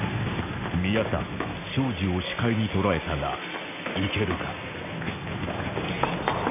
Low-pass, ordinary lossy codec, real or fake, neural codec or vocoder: 3.6 kHz; none; real; none